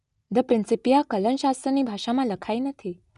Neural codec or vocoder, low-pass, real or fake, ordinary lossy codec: none; 10.8 kHz; real; none